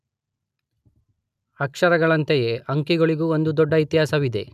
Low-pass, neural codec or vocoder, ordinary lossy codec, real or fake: 14.4 kHz; none; none; real